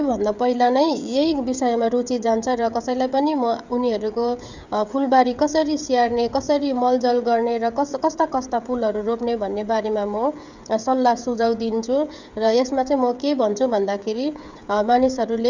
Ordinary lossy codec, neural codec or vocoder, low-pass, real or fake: Opus, 64 kbps; codec, 16 kHz, 16 kbps, FreqCodec, smaller model; 7.2 kHz; fake